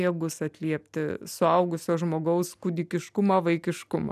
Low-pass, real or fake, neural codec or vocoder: 14.4 kHz; real; none